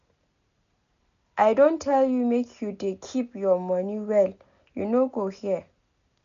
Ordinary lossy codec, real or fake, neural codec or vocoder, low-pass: none; real; none; 7.2 kHz